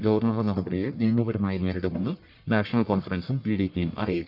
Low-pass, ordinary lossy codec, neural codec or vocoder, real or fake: 5.4 kHz; none; codec, 44.1 kHz, 1.7 kbps, Pupu-Codec; fake